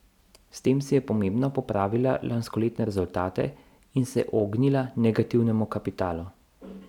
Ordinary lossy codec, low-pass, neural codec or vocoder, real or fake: none; 19.8 kHz; none; real